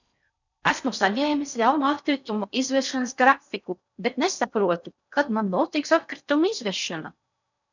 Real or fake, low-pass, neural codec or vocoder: fake; 7.2 kHz; codec, 16 kHz in and 24 kHz out, 0.6 kbps, FocalCodec, streaming, 4096 codes